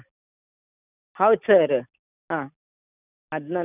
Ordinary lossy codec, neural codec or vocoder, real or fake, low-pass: none; none; real; 3.6 kHz